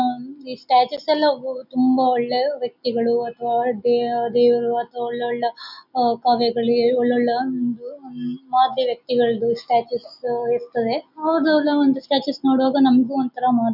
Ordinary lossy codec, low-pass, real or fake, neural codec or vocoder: none; 5.4 kHz; real; none